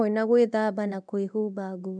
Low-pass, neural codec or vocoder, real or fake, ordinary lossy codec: 9.9 kHz; codec, 24 kHz, 0.9 kbps, DualCodec; fake; none